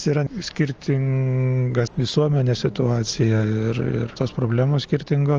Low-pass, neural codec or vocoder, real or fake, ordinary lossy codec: 7.2 kHz; none; real; Opus, 24 kbps